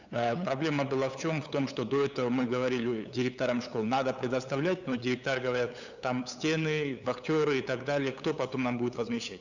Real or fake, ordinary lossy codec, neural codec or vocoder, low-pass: fake; none; codec, 16 kHz, 8 kbps, FunCodec, trained on LibriTTS, 25 frames a second; 7.2 kHz